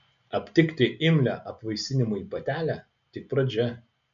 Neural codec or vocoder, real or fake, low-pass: none; real; 7.2 kHz